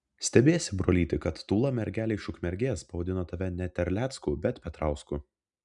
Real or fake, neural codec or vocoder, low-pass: real; none; 10.8 kHz